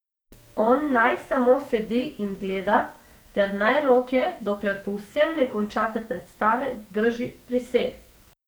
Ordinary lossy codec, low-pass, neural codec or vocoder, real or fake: none; none; codec, 44.1 kHz, 2.6 kbps, SNAC; fake